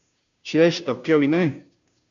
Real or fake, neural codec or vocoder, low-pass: fake; codec, 16 kHz, 0.5 kbps, FunCodec, trained on Chinese and English, 25 frames a second; 7.2 kHz